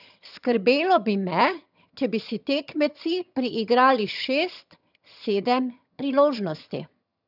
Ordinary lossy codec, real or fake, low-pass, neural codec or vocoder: none; fake; 5.4 kHz; vocoder, 22.05 kHz, 80 mel bands, HiFi-GAN